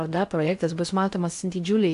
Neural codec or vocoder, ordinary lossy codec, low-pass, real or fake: codec, 16 kHz in and 24 kHz out, 0.6 kbps, FocalCodec, streaming, 4096 codes; AAC, 64 kbps; 10.8 kHz; fake